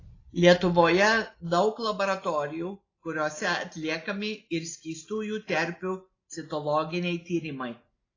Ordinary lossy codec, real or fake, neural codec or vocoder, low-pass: AAC, 32 kbps; real; none; 7.2 kHz